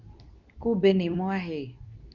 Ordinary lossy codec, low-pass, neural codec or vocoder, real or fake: none; 7.2 kHz; codec, 24 kHz, 0.9 kbps, WavTokenizer, medium speech release version 2; fake